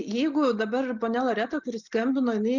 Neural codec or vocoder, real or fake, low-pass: none; real; 7.2 kHz